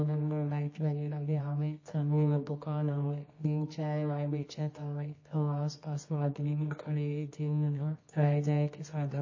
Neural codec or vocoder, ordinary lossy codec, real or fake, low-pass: codec, 24 kHz, 0.9 kbps, WavTokenizer, medium music audio release; MP3, 32 kbps; fake; 7.2 kHz